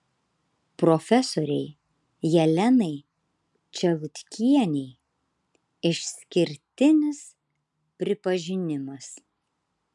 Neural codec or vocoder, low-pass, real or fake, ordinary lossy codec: none; 10.8 kHz; real; MP3, 96 kbps